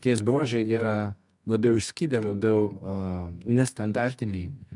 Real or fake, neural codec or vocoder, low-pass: fake; codec, 24 kHz, 0.9 kbps, WavTokenizer, medium music audio release; 10.8 kHz